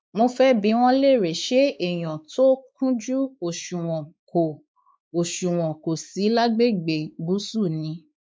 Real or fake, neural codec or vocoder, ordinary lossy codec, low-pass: fake; codec, 16 kHz, 4 kbps, X-Codec, WavLM features, trained on Multilingual LibriSpeech; none; none